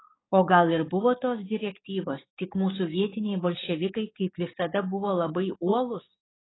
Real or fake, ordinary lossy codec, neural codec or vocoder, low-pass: fake; AAC, 16 kbps; codec, 44.1 kHz, 7.8 kbps, DAC; 7.2 kHz